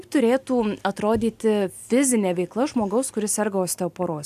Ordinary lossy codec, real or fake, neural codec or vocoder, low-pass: AAC, 96 kbps; real; none; 14.4 kHz